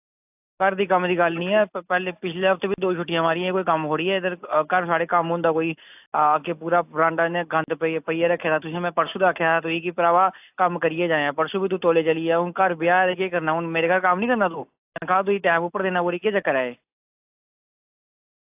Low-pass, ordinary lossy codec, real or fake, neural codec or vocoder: 3.6 kHz; AAC, 32 kbps; real; none